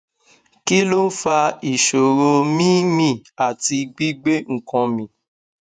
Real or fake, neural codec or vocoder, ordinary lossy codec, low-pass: fake; vocoder, 48 kHz, 128 mel bands, Vocos; none; 9.9 kHz